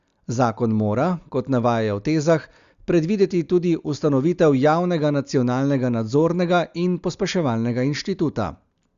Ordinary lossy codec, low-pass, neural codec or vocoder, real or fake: Opus, 64 kbps; 7.2 kHz; none; real